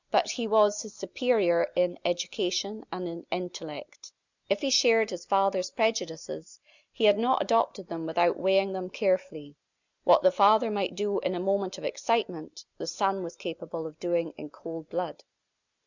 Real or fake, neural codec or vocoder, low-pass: real; none; 7.2 kHz